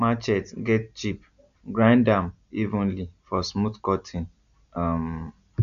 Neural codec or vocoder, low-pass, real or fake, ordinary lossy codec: none; 7.2 kHz; real; none